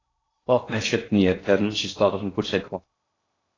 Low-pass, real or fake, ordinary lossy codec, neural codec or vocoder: 7.2 kHz; fake; AAC, 32 kbps; codec, 16 kHz in and 24 kHz out, 0.6 kbps, FocalCodec, streaming, 2048 codes